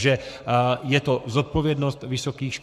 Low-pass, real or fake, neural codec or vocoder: 14.4 kHz; fake; codec, 44.1 kHz, 7.8 kbps, Pupu-Codec